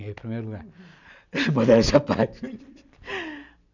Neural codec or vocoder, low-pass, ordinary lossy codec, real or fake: none; 7.2 kHz; none; real